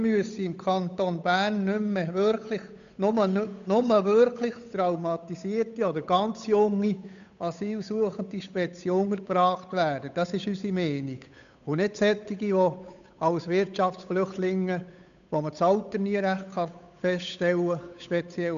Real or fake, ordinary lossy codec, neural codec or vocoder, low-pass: fake; none; codec, 16 kHz, 8 kbps, FunCodec, trained on Chinese and English, 25 frames a second; 7.2 kHz